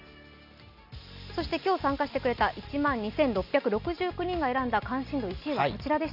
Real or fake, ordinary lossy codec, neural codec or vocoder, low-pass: real; none; none; 5.4 kHz